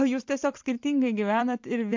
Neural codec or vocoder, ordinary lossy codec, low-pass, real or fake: vocoder, 44.1 kHz, 128 mel bands every 256 samples, BigVGAN v2; MP3, 48 kbps; 7.2 kHz; fake